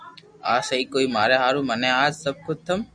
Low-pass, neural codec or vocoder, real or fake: 9.9 kHz; none; real